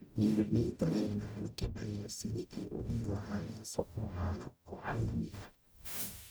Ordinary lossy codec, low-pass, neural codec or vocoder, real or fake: none; none; codec, 44.1 kHz, 0.9 kbps, DAC; fake